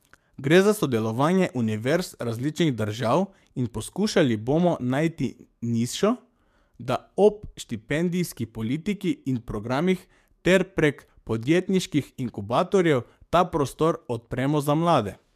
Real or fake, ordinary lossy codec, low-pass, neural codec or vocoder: fake; MP3, 96 kbps; 14.4 kHz; codec, 44.1 kHz, 7.8 kbps, DAC